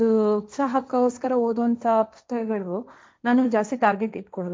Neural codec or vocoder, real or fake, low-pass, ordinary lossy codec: codec, 16 kHz, 1.1 kbps, Voila-Tokenizer; fake; none; none